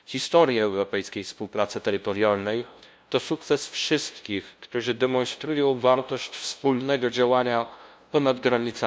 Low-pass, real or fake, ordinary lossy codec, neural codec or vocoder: none; fake; none; codec, 16 kHz, 0.5 kbps, FunCodec, trained on LibriTTS, 25 frames a second